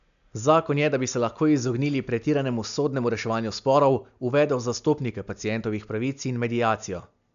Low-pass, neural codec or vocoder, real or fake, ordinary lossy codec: 7.2 kHz; none; real; none